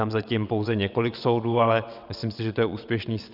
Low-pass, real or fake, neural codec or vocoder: 5.4 kHz; fake; vocoder, 22.05 kHz, 80 mel bands, WaveNeXt